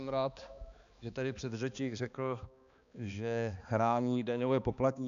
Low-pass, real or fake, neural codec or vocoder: 7.2 kHz; fake; codec, 16 kHz, 2 kbps, X-Codec, HuBERT features, trained on balanced general audio